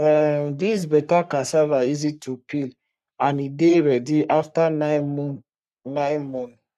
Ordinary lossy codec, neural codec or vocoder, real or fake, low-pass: none; codec, 44.1 kHz, 3.4 kbps, Pupu-Codec; fake; 14.4 kHz